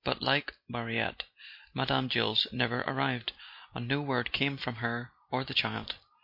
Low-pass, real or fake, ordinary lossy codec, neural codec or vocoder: 5.4 kHz; real; MP3, 32 kbps; none